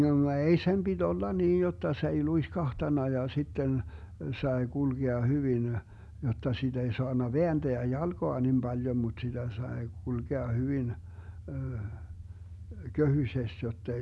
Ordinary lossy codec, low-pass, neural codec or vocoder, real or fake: none; none; none; real